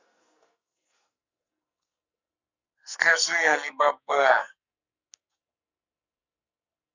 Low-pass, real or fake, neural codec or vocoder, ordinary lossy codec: 7.2 kHz; fake; codec, 44.1 kHz, 2.6 kbps, SNAC; none